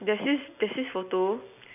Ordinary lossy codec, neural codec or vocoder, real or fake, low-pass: none; none; real; 3.6 kHz